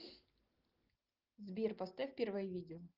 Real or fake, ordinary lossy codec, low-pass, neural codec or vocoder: real; Opus, 32 kbps; 5.4 kHz; none